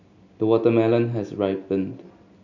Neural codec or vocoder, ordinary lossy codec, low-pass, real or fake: none; none; 7.2 kHz; real